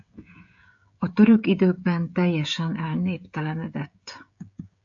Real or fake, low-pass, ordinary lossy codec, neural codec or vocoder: fake; 7.2 kHz; AAC, 64 kbps; codec, 16 kHz, 16 kbps, FreqCodec, smaller model